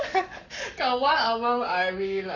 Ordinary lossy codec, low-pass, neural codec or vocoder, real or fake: none; 7.2 kHz; codec, 44.1 kHz, 7.8 kbps, Pupu-Codec; fake